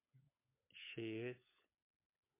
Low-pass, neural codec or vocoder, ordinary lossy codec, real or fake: 3.6 kHz; codec, 16 kHz, 16 kbps, FunCodec, trained on LibriTTS, 50 frames a second; MP3, 24 kbps; fake